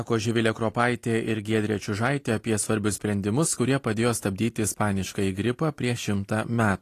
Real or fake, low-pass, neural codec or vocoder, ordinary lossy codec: real; 14.4 kHz; none; AAC, 48 kbps